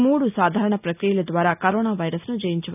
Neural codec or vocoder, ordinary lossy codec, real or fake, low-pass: none; none; real; 3.6 kHz